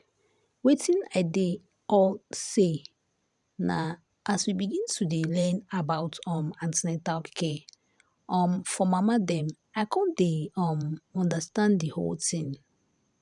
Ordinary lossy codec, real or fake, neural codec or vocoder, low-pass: none; fake; vocoder, 44.1 kHz, 128 mel bands every 512 samples, BigVGAN v2; 10.8 kHz